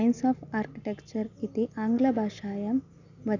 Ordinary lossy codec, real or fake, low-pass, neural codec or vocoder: none; real; 7.2 kHz; none